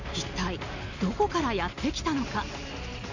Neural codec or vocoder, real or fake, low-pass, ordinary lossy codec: none; real; 7.2 kHz; none